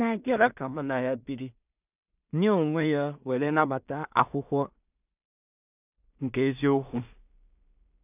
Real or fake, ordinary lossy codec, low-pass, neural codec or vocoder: fake; none; 3.6 kHz; codec, 16 kHz in and 24 kHz out, 0.4 kbps, LongCat-Audio-Codec, two codebook decoder